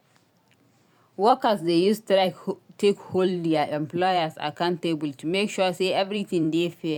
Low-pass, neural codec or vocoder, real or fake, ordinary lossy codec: 19.8 kHz; vocoder, 44.1 kHz, 128 mel bands every 512 samples, BigVGAN v2; fake; none